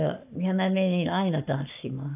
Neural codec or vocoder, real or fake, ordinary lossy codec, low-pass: codec, 44.1 kHz, 7.8 kbps, Pupu-Codec; fake; none; 3.6 kHz